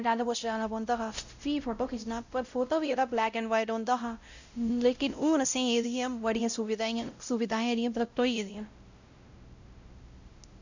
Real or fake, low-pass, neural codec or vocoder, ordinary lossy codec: fake; 7.2 kHz; codec, 16 kHz, 0.5 kbps, X-Codec, WavLM features, trained on Multilingual LibriSpeech; Opus, 64 kbps